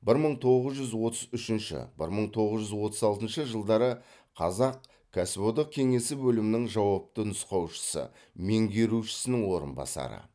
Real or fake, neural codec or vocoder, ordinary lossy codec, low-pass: real; none; none; none